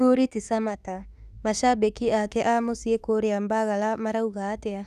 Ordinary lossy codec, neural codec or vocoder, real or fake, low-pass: none; autoencoder, 48 kHz, 32 numbers a frame, DAC-VAE, trained on Japanese speech; fake; 14.4 kHz